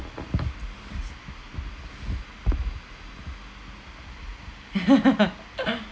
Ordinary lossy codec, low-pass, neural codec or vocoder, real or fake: none; none; none; real